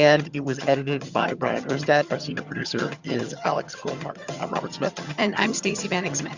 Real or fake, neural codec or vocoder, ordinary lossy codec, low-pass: fake; vocoder, 22.05 kHz, 80 mel bands, HiFi-GAN; Opus, 64 kbps; 7.2 kHz